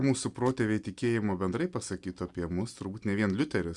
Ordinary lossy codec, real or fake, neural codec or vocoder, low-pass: Opus, 64 kbps; real; none; 10.8 kHz